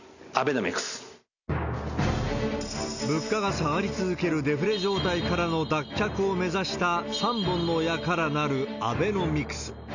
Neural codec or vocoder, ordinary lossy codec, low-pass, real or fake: none; none; 7.2 kHz; real